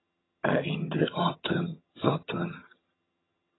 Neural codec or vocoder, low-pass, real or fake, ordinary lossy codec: vocoder, 22.05 kHz, 80 mel bands, HiFi-GAN; 7.2 kHz; fake; AAC, 16 kbps